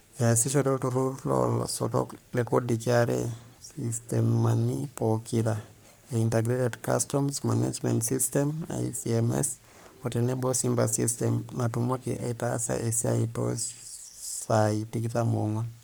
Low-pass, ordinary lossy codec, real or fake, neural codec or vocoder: none; none; fake; codec, 44.1 kHz, 3.4 kbps, Pupu-Codec